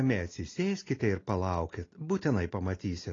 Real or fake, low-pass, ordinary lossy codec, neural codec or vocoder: real; 7.2 kHz; AAC, 32 kbps; none